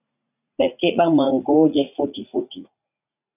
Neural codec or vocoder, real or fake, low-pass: vocoder, 44.1 kHz, 80 mel bands, Vocos; fake; 3.6 kHz